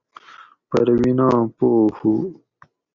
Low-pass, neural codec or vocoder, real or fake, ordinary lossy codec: 7.2 kHz; none; real; Opus, 64 kbps